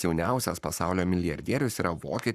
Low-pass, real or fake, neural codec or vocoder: 14.4 kHz; fake; codec, 44.1 kHz, 7.8 kbps, Pupu-Codec